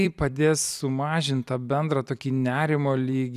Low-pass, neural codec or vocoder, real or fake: 14.4 kHz; vocoder, 44.1 kHz, 128 mel bands every 256 samples, BigVGAN v2; fake